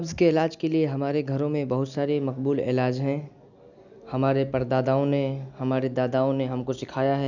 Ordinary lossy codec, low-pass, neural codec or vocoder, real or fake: none; 7.2 kHz; none; real